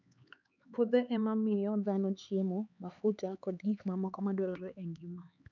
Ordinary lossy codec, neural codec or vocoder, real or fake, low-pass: none; codec, 16 kHz, 4 kbps, X-Codec, HuBERT features, trained on LibriSpeech; fake; 7.2 kHz